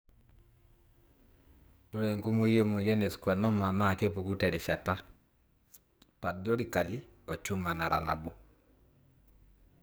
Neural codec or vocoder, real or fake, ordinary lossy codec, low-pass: codec, 44.1 kHz, 2.6 kbps, SNAC; fake; none; none